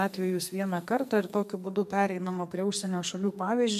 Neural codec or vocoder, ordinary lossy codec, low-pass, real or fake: codec, 44.1 kHz, 2.6 kbps, SNAC; MP3, 96 kbps; 14.4 kHz; fake